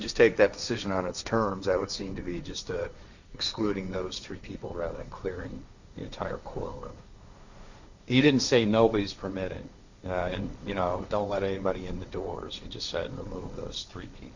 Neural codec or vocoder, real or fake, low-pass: codec, 16 kHz, 1.1 kbps, Voila-Tokenizer; fake; 7.2 kHz